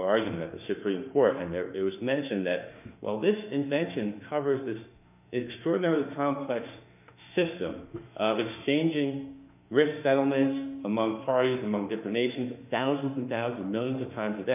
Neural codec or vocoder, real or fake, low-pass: autoencoder, 48 kHz, 32 numbers a frame, DAC-VAE, trained on Japanese speech; fake; 3.6 kHz